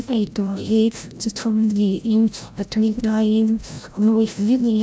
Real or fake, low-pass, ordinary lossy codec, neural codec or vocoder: fake; none; none; codec, 16 kHz, 0.5 kbps, FreqCodec, larger model